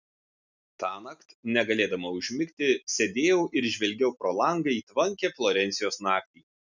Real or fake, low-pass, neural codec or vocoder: real; 7.2 kHz; none